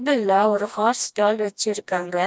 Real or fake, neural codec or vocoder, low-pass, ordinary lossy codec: fake; codec, 16 kHz, 1 kbps, FreqCodec, smaller model; none; none